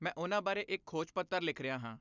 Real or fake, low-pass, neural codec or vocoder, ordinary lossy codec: real; 7.2 kHz; none; none